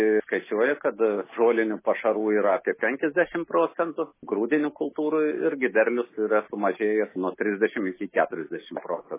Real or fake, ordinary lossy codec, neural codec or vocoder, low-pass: real; MP3, 16 kbps; none; 3.6 kHz